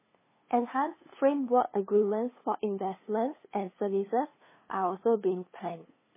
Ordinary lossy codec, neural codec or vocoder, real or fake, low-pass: MP3, 16 kbps; codec, 16 kHz, 2 kbps, FunCodec, trained on LibriTTS, 25 frames a second; fake; 3.6 kHz